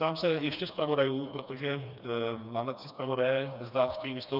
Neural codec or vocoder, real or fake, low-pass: codec, 16 kHz, 2 kbps, FreqCodec, smaller model; fake; 5.4 kHz